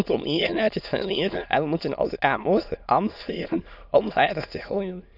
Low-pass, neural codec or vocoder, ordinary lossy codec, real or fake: 5.4 kHz; autoencoder, 22.05 kHz, a latent of 192 numbers a frame, VITS, trained on many speakers; none; fake